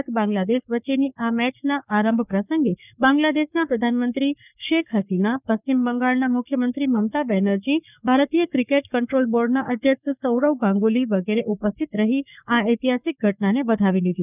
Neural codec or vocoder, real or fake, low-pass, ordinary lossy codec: codec, 44.1 kHz, 3.4 kbps, Pupu-Codec; fake; 3.6 kHz; none